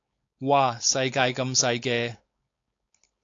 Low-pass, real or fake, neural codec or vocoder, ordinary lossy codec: 7.2 kHz; fake; codec, 16 kHz, 4.8 kbps, FACodec; AAC, 48 kbps